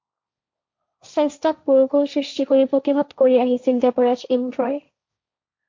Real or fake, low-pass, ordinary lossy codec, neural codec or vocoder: fake; 7.2 kHz; MP3, 48 kbps; codec, 16 kHz, 1.1 kbps, Voila-Tokenizer